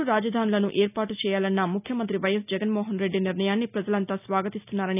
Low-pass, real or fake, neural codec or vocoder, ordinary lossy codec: 3.6 kHz; real; none; none